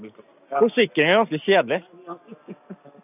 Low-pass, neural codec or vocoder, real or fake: 3.6 kHz; none; real